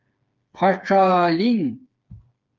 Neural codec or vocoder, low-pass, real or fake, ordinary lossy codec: codec, 16 kHz, 4 kbps, FreqCodec, smaller model; 7.2 kHz; fake; Opus, 24 kbps